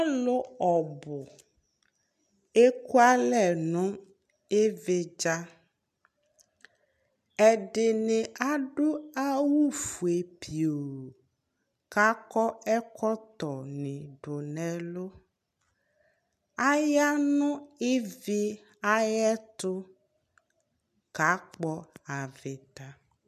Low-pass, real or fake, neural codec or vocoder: 14.4 kHz; real; none